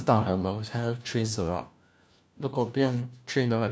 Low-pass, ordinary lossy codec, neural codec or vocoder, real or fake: none; none; codec, 16 kHz, 1 kbps, FunCodec, trained on LibriTTS, 50 frames a second; fake